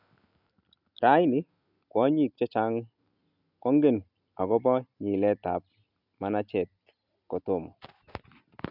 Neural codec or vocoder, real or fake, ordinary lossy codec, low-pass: none; real; none; 5.4 kHz